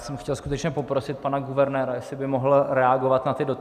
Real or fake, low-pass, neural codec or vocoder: real; 14.4 kHz; none